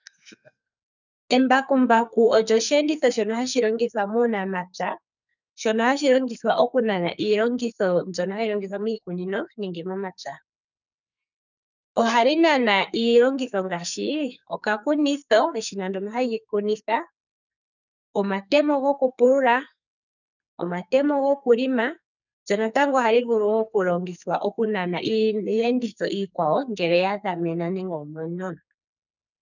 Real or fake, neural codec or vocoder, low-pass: fake; codec, 44.1 kHz, 2.6 kbps, SNAC; 7.2 kHz